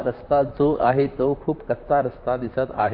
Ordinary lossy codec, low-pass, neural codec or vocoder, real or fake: Opus, 64 kbps; 5.4 kHz; vocoder, 44.1 kHz, 128 mel bands, Pupu-Vocoder; fake